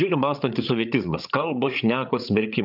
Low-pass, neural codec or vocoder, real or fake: 5.4 kHz; codec, 16 kHz, 8 kbps, FreqCodec, larger model; fake